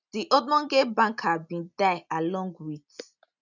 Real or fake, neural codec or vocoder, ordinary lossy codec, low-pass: real; none; none; 7.2 kHz